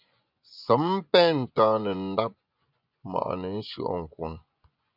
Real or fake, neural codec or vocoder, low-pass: fake; vocoder, 24 kHz, 100 mel bands, Vocos; 5.4 kHz